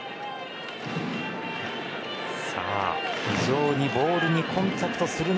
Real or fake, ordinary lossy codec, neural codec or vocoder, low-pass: real; none; none; none